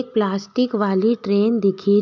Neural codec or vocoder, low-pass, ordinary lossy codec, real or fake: none; 7.2 kHz; none; real